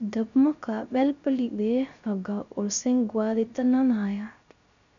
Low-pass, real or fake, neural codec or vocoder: 7.2 kHz; fake; codec, 16 kHz, 0.3 kbps, FocalCodec